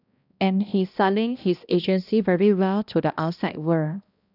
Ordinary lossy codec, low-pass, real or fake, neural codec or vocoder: none; 5.4 kHz; fake; codec, 16 kHz, 1 kbps, X-Codec, HuBERT features, trained on balanced general audio